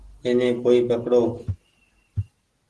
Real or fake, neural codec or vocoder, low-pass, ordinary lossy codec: real; none; 10.8 kHz; Opus, 16 kbps